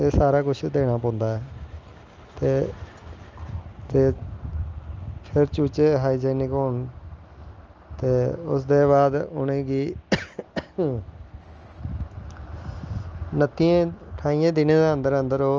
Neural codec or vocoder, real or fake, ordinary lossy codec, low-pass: none; real; Opus, 24 kbps; 7.2 kHz